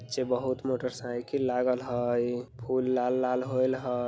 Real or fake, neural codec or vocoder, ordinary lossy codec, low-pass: real; none; none; none